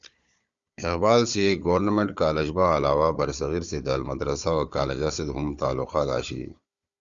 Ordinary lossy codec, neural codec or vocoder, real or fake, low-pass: Opus, 64 kbps; codec, 16 kHz, 4 kbps, FunCodec, trained on Chinese and English, 50 frames a second; fake; 7.2 kHz